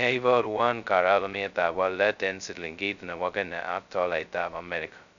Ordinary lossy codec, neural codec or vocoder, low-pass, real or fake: none; codec, 16 kHz, 0.2 kbps, FocalCodec; 7.2 kHz; fake